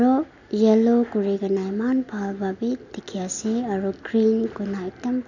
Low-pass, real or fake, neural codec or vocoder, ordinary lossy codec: 7.2 kHz; real; none; none